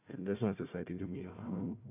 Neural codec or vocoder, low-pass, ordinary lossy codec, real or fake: codec, 16 kHz, 1 kbps, FunCodec, trained on Chinese and English, 50 frames a second; 3.6 kHz; none; fake